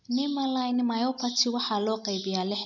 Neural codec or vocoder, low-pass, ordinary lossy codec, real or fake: none; 7.2 kHz; none; real